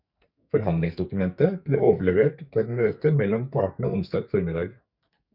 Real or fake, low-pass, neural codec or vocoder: fake; 5.4 kHz; codec, 44.1 kHz, 2.6 kbps, SNAC